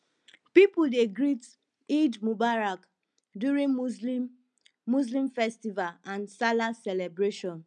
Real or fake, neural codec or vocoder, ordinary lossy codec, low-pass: real; none; none; 9.9 kHz